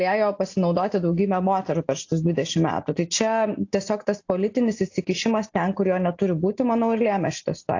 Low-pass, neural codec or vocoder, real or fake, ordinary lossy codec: 7.2 kHz; none; real; AAC, 48 kbps